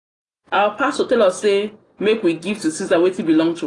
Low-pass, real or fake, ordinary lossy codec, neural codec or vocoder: 10.8 kHz; real; AAC, 32 kbps; none